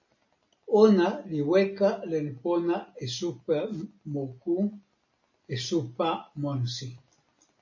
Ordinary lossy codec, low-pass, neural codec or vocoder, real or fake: MP3, 32 kbps; 7.2 kHz; none; real